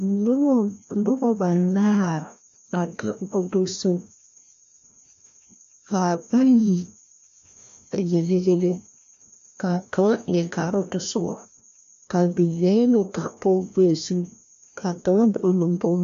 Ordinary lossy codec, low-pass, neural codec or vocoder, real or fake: MP3, 64 kbps; 7.2 kHz; codec, 16 kHz, 1 kbps, FreqCodec, larger model; fake